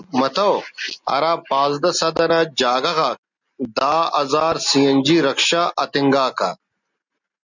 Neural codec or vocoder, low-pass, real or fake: none; 7.2 kHz; real